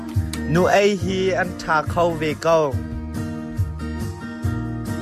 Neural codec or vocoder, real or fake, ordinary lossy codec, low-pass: none; real; MP3, 64 kbps; 14.4 kHz